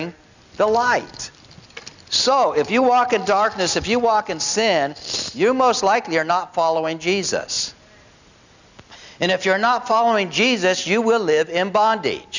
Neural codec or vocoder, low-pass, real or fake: none; 7.2 kHz; real